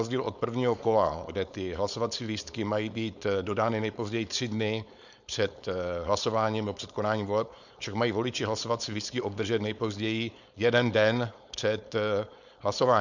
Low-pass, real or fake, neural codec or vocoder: 7.2 kHz; fake; codec, 16 kHz, 4.8 kbps, FACodec